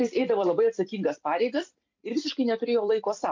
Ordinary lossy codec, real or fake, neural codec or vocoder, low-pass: MP3, 64 kbps; fake; vocoder, 44.1 kHz, 128 mel bands every 256 samples, BigVGAN v2; 7.2 kHz